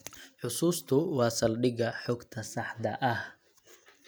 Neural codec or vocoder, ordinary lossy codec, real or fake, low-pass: none; none; real; none